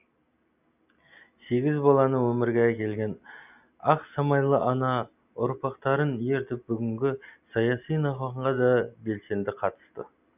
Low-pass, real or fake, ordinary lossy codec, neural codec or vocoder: 3.6 kHz; real; none; none